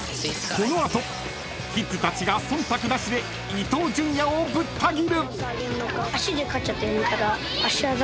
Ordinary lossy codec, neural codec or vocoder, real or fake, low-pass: none; none; real; none